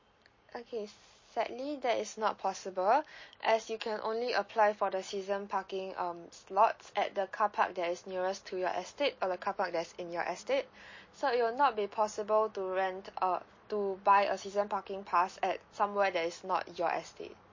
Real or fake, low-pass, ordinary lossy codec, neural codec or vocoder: real; 7.2 kHz; MP3, 32 kbps; none